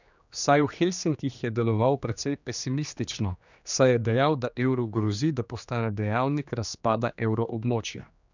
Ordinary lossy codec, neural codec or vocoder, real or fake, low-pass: none; codec, 16 kHz, 2 kbps, X-Codec, HuBERT features, trained on general audio; fake; 7.2 kHz